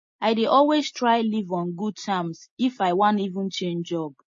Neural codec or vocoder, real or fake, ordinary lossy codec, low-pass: none; real; MP3, 32 kbps; 7.2 kHz